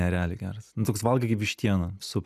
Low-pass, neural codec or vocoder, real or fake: 14.4 kHz; none; real